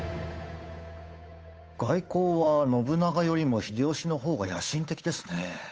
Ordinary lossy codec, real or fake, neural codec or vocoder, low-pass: none; fake; codec, 16 kHz, 8 kbps, FunCodec, trained on Chinese and English, 25 frames a second; none